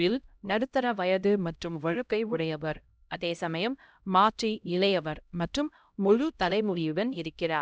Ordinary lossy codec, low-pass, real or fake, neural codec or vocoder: none; none; fake; codec, 16 kHz, 0.5 kbps, X-Codec, HuBERT features, trained on LibriSpeech